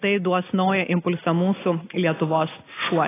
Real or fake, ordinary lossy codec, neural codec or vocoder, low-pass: real; AAC, 16 kbps; none; 3.6 kHz